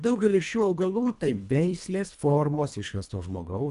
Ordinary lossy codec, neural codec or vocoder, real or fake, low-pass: AAC, 96 kbps; codec, 24 kHz, 1.5 kbps, HILCodec; fake; 10.8 kHz